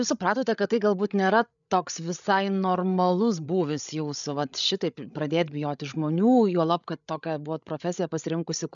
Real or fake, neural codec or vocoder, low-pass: fake; codec, 16 kHz, 8 kbps, FreqCodec, larger model; 7.2 kHz